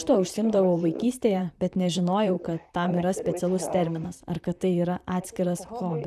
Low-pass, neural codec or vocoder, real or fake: 14.4 kHz; vocoder, 44.1 kHz, 128 mel bands, Pupu-Vocoder; fake